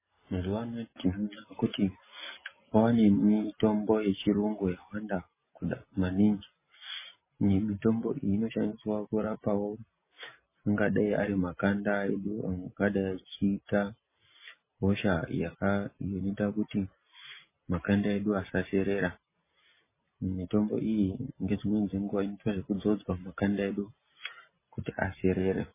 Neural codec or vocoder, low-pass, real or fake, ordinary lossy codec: none; 3.6 kHz; real; MP3, 16 kbps